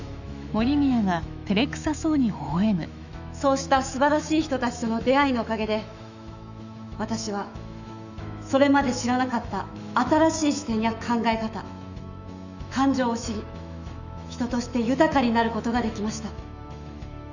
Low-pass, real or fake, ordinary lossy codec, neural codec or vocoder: 7.2 kHz; fake; none; autoencoder, 48 kHz, 128 numbers a frame, DAC-VAE, trained on Japanese speech